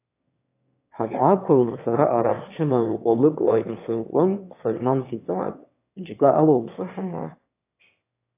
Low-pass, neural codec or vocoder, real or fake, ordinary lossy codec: 3.6 kHz; autoencoder, 22.05 kHz, a latent of 192 numbers a frame, VITS, trained on one speaker; fake; AAC, 24 kbps